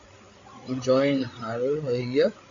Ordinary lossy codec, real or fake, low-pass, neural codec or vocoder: Opus, 64 kbps; fake; 7.2 kHz; codec, 16 kHz, 8 kbps, FreqCodec, larger model